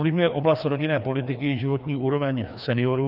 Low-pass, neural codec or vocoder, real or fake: 5.4 kHz; codec, 16 kHz, 2 kbps, FreqCodec, larger model; fake